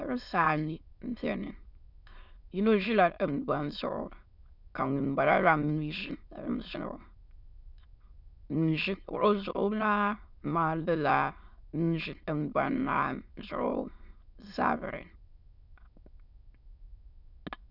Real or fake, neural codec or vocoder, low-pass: fake; autoencoder, 22.05 kHz, a latent of 192 numbers a frame, VITS, trained on many speakers; 5.4 kHz